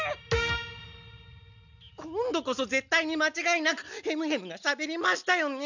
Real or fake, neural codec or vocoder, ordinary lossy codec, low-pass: real; none; none; 7.2 kHz